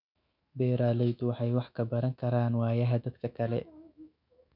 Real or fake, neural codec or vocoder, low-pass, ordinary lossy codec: fake; autoencoder, 48 kHz, 128 numbers a frame, DAC-VAE, trained on Japanese speech; 5.4 kHz; none